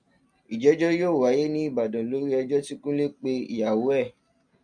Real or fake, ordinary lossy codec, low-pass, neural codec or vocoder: real; MP3, 96 kbps; 9.9 kHz; none